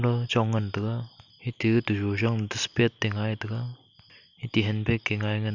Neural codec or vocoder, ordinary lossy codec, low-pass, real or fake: none; none; 7.2 kHz; real